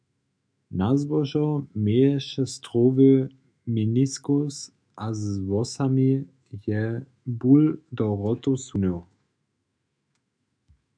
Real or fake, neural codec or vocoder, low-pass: fake; autoencoder, 48 kHz, 128 numbers a frame, DAC-VAE, trained on Japanese speech; 9.9 kHz